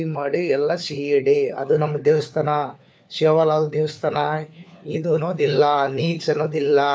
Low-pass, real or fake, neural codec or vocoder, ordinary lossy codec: none; fake; codec, 16 kHz, 4 kbps, FunCodec, trained on LibriTTS, 50 frames a second; none